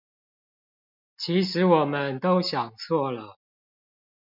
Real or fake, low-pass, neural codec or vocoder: real; 5.4 kHz; none